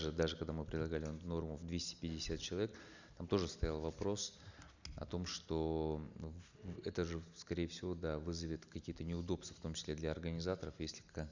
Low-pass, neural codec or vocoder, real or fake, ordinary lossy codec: 7.2 kHz; none; real; Opus, 64 kbps